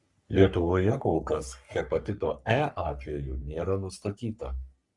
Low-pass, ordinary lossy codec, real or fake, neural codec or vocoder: 10.8 kHz; Opus, 64 kbps; fake; codec, 44.1 kHz, 3.4 kbps, Pupu-Codec